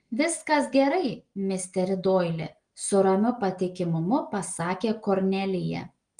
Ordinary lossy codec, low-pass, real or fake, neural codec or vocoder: Opus, 24 kbps; 9.9 kHz; real; none